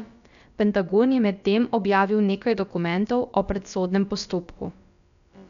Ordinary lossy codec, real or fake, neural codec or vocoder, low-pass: none; fake; codec, 16 kHz, about 1 kbps, DyCAST, with the encoder's durations; 7.2 kHz